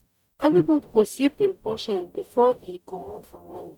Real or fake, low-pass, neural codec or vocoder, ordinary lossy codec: fake; 19.8 kHz; codec, 44.1 kHz, 0.9 kbps, DAC; none